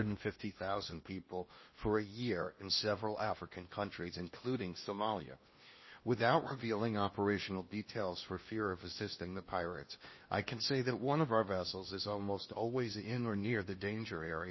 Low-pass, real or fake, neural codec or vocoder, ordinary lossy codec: 7.2 kHz; fake; codec, 16 kHz in and 24 kHz out, 0.8 kbps, FocalCodec, streaming, 65536 codes; MP3, 24 kbps